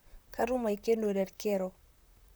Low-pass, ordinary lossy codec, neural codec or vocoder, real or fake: none; none; vocoder, 44.1 kHz, 128 mel bands, Pupu-Vocoder; fake